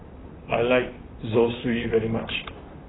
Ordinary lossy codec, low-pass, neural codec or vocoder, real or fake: AAC, 16 kbps; 7.2 kHz; vocoder, 44.1 kHz, 128 mel bands, Pupu-Vocoder; fake